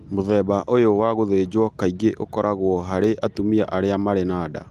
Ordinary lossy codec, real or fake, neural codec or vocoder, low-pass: Opus, 32 kbps; real; none; 14.4 kHz